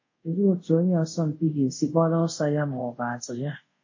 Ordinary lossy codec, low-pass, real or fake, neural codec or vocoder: MP3, 32 kbps; 7.2 kHz; fake; codec, 24 kHz, 0.5 kbps, DualCodec